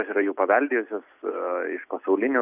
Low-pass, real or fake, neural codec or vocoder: 3.6 kHz; real; none